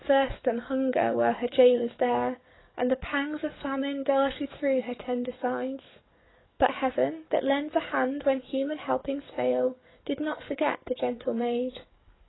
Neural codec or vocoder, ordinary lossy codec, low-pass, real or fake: codec, 44.1 kHz, 7.8 kbps, DAC; AAC, 16 kbps; 7.2 kHz; fake